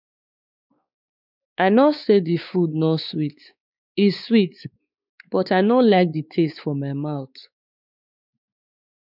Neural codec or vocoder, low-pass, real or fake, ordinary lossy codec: codec, 16 kHz, 4 kbps, X-Codec, WavLM features, trained on Multilingual LibriSpeech; 5.4 kHz; fake; none